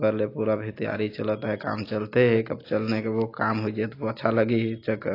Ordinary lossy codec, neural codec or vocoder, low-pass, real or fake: AAC, 32 kbps; none; 5.4 kHz; real